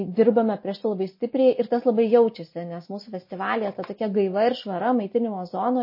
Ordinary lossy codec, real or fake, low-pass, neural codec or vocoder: MP3, 24 kbps; real; 5.4 kHz; none